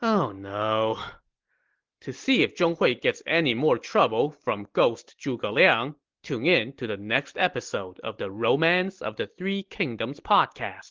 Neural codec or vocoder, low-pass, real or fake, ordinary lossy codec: none; 7.2 kHz; real; Opus, 16 kbps